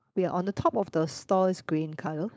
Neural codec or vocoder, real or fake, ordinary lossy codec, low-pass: codec, 16 kHz, 4.8 kbps, FACodec; fake; none; none